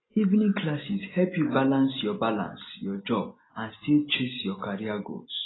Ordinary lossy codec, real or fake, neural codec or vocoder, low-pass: AAC, 16 kbps; real; none; 7.2 kHz